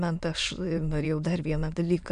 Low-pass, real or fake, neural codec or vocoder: 9.9 kHz; fake; autoencoder, 22.05 kHz, a latent of 192 numbers a frame, VITS, trained on many speakers